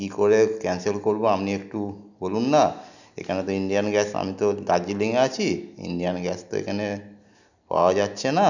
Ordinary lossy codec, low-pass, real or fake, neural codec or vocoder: none; 7.2 kHz; real; none